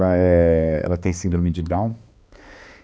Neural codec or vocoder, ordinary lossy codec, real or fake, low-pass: codec, 16 kHz, 2 kbps, X-Codec, HuBERT features, trained on balanced general audio; none; fake; none